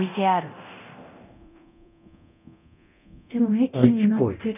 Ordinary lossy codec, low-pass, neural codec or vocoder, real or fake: none; 3.6 kHz; codec, 24 kHz, 0.9 kbps, DualCodec; fake